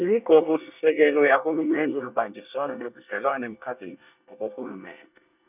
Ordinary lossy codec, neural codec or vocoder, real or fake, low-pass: none; codec, 24 kHz, 1 kbps, SNAC; fake; 3.6 kHz